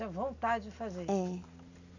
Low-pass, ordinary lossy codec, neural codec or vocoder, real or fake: 7.2 kHz; none; none; real